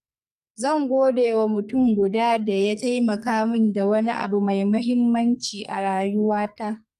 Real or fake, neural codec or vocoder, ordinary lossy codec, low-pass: fake; codec, 44.1 kHz, 2.6 kbps, SNAC; none; 14.4 kHz